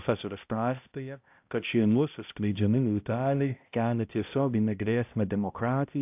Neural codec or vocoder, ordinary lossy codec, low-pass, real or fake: codec, 16 kHz, 0.5 kbps, X-Codec, HuBERT features, trained on balanced general audio; AAC, 32 kbps; 3.6 kHz; fake